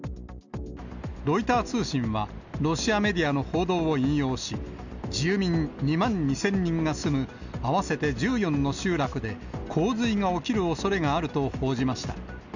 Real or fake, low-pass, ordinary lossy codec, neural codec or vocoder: real; 7.2 kHz; none; none